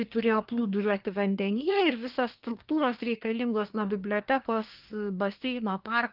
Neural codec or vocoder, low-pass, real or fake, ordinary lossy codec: codec, 24 kHz, 1 kbps, SNAC; 5.4 kHz; fake; Opus, 24 kbps